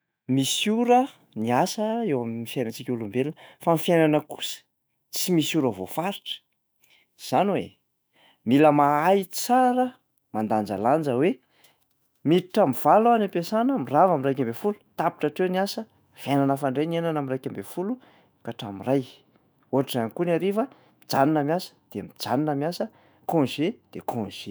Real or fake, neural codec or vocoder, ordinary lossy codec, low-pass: fake; autoencoder, 48 kHz, 128 numbers a frame, DAC-VAE, trained on Japanese speech; none; none